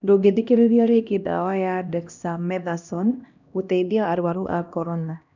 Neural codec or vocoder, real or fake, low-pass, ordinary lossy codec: codec, 16 kHz, 1 kbps, X-Codec, HuBERT features, trained on LibriSpeech; fake; 7.2 kHz; none